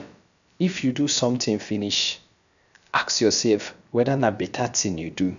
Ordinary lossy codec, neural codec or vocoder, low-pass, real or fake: none; codec, 16 kHz, about 1 kbps, DyCAST, with the encoder's durations; 7.2 kHz; fake